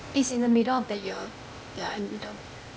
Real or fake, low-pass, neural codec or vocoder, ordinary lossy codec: fake; none; codec, 16 kHz, 0.8 kbps, ZipCodec; none